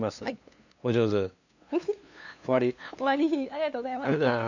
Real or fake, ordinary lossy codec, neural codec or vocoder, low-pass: fake; none; codec, 16 kHz, 2 kbps, FunCodec, trained on LibriTTS, 25 frames a second; 7.2 kHz